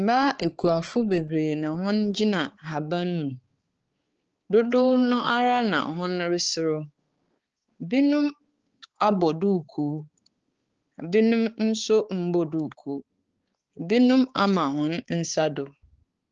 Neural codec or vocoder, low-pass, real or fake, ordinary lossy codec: codec, 16 kHz, 4 kbps, X-Codec, HuBERT features, trained on balanced general audio; 7.2 kHz; fake; Opus, 16 kbps